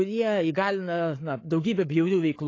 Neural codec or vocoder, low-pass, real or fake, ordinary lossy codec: codec, 16 kHz, 8 kbps, FreqCodec, larger model; 7.2 kHz; fake; AAC, 32 kbps